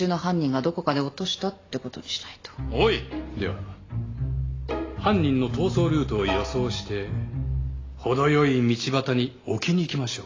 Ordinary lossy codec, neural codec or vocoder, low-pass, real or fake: AAC, 32 kbps; none; 7.2 kHz; real